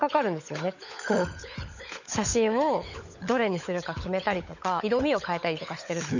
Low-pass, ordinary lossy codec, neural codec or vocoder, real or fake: 7.2 kHz; none; codec, 16 kHz, 16 kbps, FunCodec, trained on LibriTTS, 50 frames a second; fake